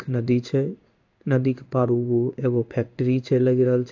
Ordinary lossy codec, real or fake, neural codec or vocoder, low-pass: AAC, 48 kbps; fake; codec, 16 kHz in and 24 kHz out, 1 kbps, XY-Tokenizer; 7.2 kHz